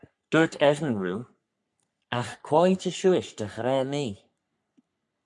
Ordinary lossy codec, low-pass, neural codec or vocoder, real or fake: MP3, 96 kbps; 10.8 kHz; codec, 44.1 kHz, 3.4 kbps, Pupu-Codec; fake